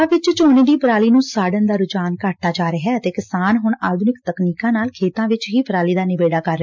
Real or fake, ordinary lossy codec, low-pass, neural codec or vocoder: real; MP3, 64 kbps; 7.2 kHz; none